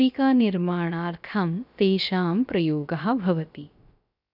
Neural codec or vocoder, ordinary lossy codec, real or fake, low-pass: codec, 16 kHz, about 1 kbps, DyCAST, with the encoder's durations; none; fake; 5.4 kHz